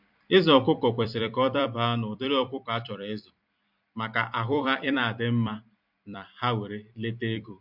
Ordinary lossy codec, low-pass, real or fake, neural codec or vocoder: MP3, 48 kbps; 5.4 kHz; fake; vocoder, 44.1 kHz, 128 mel bands every 256 samples, BigVGAN v2